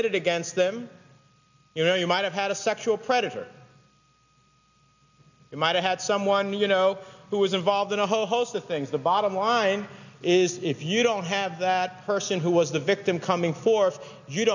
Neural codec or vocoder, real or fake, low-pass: none; real; 7.2 kHz